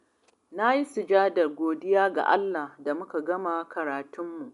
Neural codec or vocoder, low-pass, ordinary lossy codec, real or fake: none; 10.8 kHz; none; real